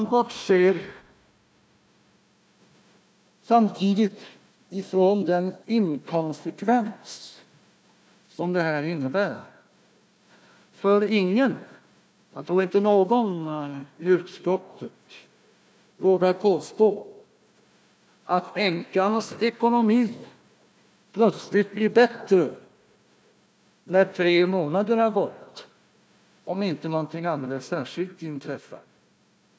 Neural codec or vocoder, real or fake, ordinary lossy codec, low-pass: codec, 16 kHz, 1 kbps, FunCodec, trained on Chinese and English, 50 frames a second; fake; none; none